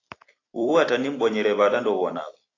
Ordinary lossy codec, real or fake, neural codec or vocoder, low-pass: AAC, 32 kbps; fake; vocoder, 44.1 kHz, 128 mel bands every 256 samples, BigVGAN v2; 7.2 kHz